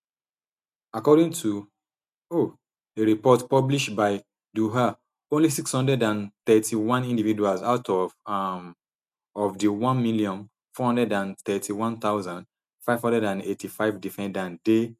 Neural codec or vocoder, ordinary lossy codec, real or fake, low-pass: none; none; real; 14.4 kHz